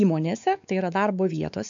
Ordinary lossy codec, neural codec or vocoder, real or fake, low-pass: AAC, 64 kbps; codec, 16 kHz, 4 kbps, X-Codec, WavLM features, trained on Multilingual LibriSpeech; fake; 7.2 kHz